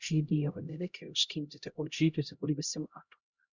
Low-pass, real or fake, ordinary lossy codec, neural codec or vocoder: 7.2 kHz; fake; Opus, 64 kbps; codec, 16 kHz, 0.5 kbps, X-Codec, HuBERT features, trained on LibriSpeech